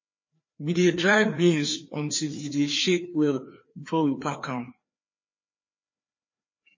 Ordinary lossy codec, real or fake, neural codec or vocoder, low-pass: MP3, 32 kbps; fake; codec, 16 kHz, 2 kbps, FreqCodec, larger model; 7.2 kHz